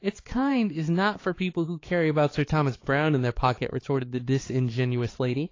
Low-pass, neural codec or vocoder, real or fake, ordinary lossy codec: 7.2 kHz; codec, 16 kHz, 4 kbps, X-Codec, HuBERT features, trained on balanced general audio; fake; AAC, 32 kbps